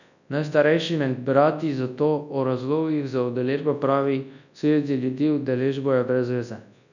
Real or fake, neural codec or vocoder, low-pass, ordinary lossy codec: fake; codec, 24 kHz, 0.9 kbps, WavTokenizer, large speech release; 7.2 kHz; none